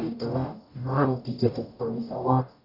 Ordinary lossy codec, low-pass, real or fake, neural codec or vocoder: none; 5.4 kHz; fake; codec, 44.1 kHz, 0.9 kbps, DAC